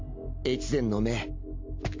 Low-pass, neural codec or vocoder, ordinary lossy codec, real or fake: 7.2 kHz; none; MP3, 64 kbps; real